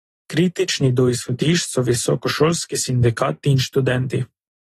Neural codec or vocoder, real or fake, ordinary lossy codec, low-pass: none; real; AAC, 32 kbps; 19.8 kHz